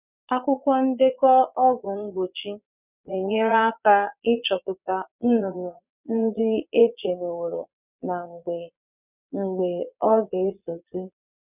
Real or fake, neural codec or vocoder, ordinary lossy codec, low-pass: fake; vocoder, 22.05 kHz, 80 mel bands, Vocos; none; 3.6 kHz